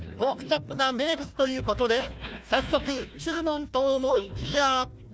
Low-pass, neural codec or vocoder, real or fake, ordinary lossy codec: none; codec, 16 kHz, 1 kbps, FunCodec, trained on Chinese and English, 50 frames a second; fake; none